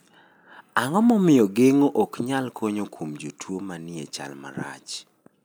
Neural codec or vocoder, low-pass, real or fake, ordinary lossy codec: none; none; real; none